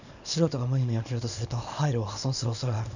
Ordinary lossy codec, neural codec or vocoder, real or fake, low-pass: none; codec, 24 kHz, 0.9 kbps, WavTokenizer, small release; fake; 7.2 kHz